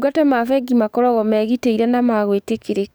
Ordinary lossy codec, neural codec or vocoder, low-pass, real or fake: none; codec, 44.1 kHz, 7.8 kbps, DAC; none; fake